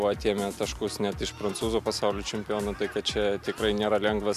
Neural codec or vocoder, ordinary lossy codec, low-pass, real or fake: none; MP3, 96 kbps; 14.4 kHz; real